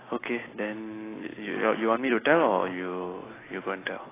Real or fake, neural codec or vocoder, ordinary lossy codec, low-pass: real; none; AAC, 16 kbps; 3.6 kHz